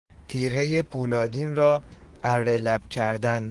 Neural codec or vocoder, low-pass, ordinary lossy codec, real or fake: codec, 24 kHz, 1 kbps, SNAC; 10.8 kHz; Opus, 24 kbps; fake